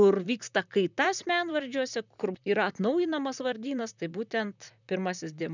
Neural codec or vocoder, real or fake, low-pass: vocoder, 44.1 kHz, 128 mel bands, Pupu-Vocoder; fake; 7.2 kHz